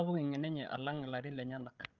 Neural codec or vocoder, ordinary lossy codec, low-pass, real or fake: codec, 16 kHz, 16 kbps, FreqCodec, larger model; Opus, 24 kbps; 7.2 kHz; fake